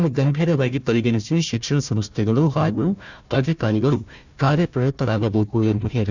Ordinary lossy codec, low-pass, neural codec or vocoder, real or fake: none; 7.2 kHz; codec, 16 kHz, 1 kbps, FunCodec, trained on Chinese and English, 50 frames a second; fake